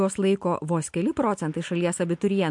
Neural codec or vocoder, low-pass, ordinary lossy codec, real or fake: none; 10.8 kHz; MP3, 64 kbps; real